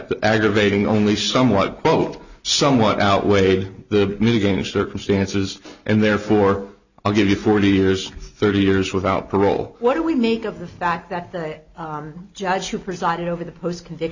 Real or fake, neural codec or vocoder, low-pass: real; none; 7.2 kHz